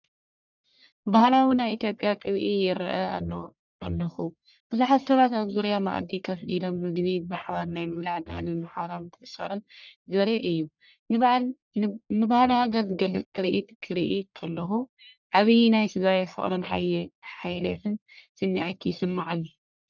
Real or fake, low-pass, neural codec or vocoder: fake; 7.2 kHz; codec, 44.1 kHz, 1.7 kbps, Pupu-Codec